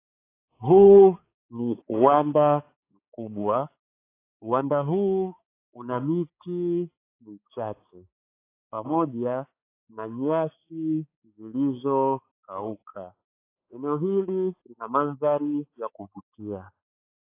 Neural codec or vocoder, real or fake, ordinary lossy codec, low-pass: codec, 16 kHz, 4 kbps, X-Codec, HuBERT features, trained on balanced general audio; fake; AAC, 24 kbps; 3.6 kHz